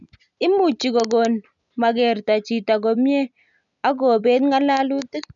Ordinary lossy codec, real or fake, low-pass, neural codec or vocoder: none; real; 7.2 kHz; none